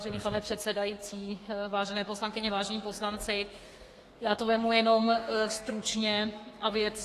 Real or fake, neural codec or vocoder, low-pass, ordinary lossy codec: fake; codec, 32 kHz, 1.9 kbps, SNAC; 14.4 kHz; AAC, 48 kbps